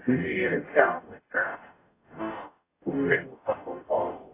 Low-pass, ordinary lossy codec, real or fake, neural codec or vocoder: 3.6 kHz; AAC, 24 kbps; fake; codec, 44.1 kHz, 0.9 kbps, DAC